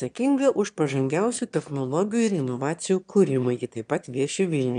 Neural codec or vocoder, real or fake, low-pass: autoencoder, 22.05 kHz, a latent of 192 numbers a frame, VITS, trained on one speaker; fake; 9.9 kHz